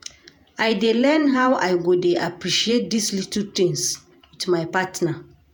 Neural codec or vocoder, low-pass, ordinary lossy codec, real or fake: vocoder, 48 kHz, 128 mel bands, Vocos; none; none; fake